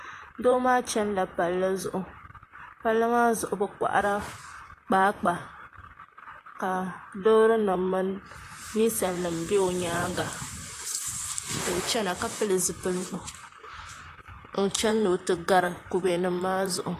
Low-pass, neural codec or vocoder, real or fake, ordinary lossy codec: 14.4 kHz; vocoder, 44.1 kHz, 128 mel bands, Pupu-Vocoder; fake; AAC, 48 kbps